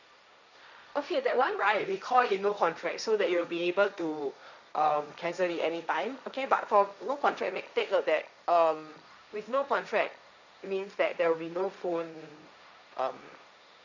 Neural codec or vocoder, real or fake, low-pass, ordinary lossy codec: codec, 16 kHz, 1.1 kbps, Voila-Tokenizer; fake; 7.2 kHz; none